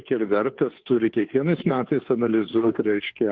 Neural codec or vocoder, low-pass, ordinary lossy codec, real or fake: codec, 16 kHz, 2 kbps, FunCodec, trained on Chinese and English, 25 frames a second; 7.2 kHz; Opus, 32 kbps; fake